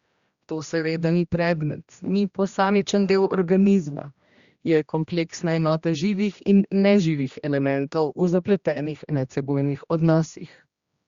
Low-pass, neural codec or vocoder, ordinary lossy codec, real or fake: 7.2 kHz; codec, 16 kHz, 1 kbps, X-Codec, HuBERT features, trained on general audio; Opus, 64 kbps; fake